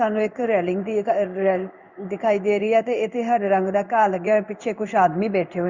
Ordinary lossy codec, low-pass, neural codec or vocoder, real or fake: Opus, 64 kbps; 7.2 kHz; codec, 16 kHz in and 24 kHz out, 1 kbps, XY-Tokenizer; fake